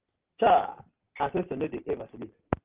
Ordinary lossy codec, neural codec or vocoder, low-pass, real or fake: Opus, 16 kbps; none; 3.6 kHz; real